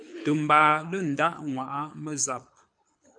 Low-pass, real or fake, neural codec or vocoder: 9.9 kHz; fake; codec, 24 kHz, 6 kbps, HILCodec